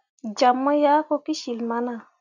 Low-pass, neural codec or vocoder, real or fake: 7.2 kHz; none; real